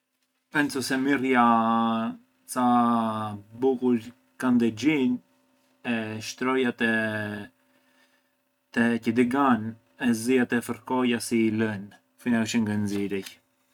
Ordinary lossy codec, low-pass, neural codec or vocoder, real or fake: none; 19.8 kHz; none; real